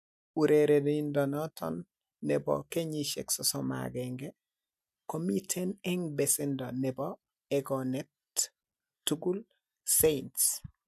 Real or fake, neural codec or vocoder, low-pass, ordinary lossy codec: real; none; 14.4 kHz; none